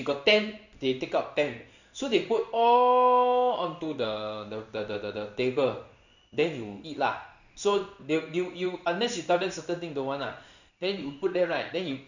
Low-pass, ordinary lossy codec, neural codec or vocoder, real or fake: 7.2 kHz; none; codec, 16 kHz in and 24 kHz out, 1 kbps, XY-Tokenizer; fake